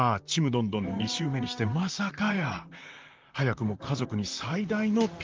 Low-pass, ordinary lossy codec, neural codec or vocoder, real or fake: 7.2 kHz; Opus, 32 kbps; vocoder, 44.1 kHz, 128 mel bands, Pupu-Vocoder; fake